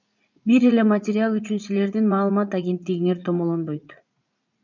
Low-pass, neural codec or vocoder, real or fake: 7.2 kHz; vocoder, 44.1 kHz, 80 mel bands, Vocos; fake